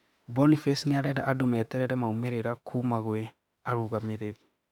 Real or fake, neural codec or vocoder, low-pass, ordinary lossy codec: fake; autoencoder, 48 kHz, 32 numbers a frame, DAC-VAE, trained on Japanese speech; 19.8 kHz; none